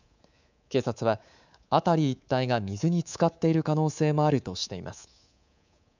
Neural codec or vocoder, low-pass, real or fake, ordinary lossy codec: codec, 24 kHz, 3.1 kbps, DualCodec; 7.2 kHz; fake; none